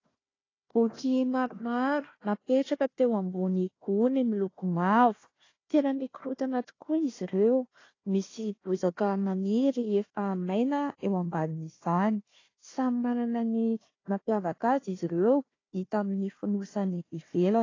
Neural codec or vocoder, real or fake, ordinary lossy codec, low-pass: codec, 16 kHz, 1 kbps, FunCodec, trained on Chinese and English, 50 frames a second; fake; AAC, 32 kbps; 7.2 kHz